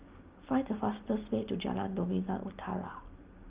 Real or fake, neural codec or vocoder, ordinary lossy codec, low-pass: real; none; Opus, 24 kbps; 3.6 kHz